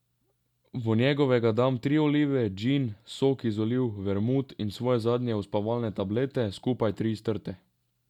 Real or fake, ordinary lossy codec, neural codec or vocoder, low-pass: real; none; none; 19.8 kHz